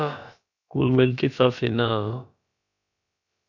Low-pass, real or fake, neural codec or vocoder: 7.2 kHz; fake; codec, 16 kHz, about 1 kbps, DyCAST, with the encoder's durations